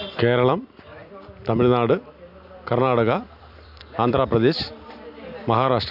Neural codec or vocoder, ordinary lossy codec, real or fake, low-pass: vocoder, 44.1 kHz, 128 mel bands every 256 samples, BigVGAN v2; none; fake; 5.4 kHz